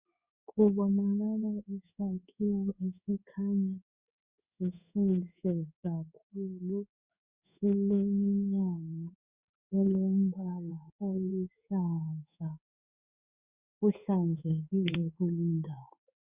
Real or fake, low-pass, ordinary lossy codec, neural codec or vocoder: fake; 3.6 kHz; Opus, 64 kbps; codec, 16 kHz in and 24 kHz out, 1 kbps, XY-Tokenizer